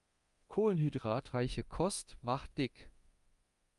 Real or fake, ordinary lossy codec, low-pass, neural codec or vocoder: fake; Opus, 32 kbps; 10.8 kHz; codec, 24 kHz, 0.9 kbps, DualCodec